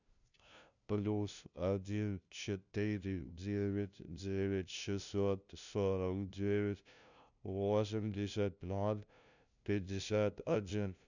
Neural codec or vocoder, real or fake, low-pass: codec, 16 kHz, 0.5 kbps, FunCodec, trained on LibriTTS, 25 frames a second; fake; 7.2 kHz